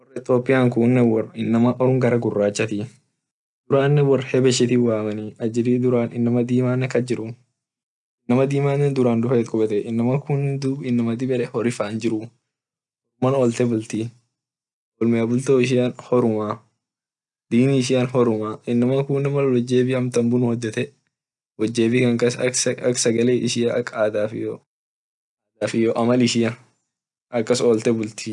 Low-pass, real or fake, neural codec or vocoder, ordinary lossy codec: 10.8 kHz; real; none; none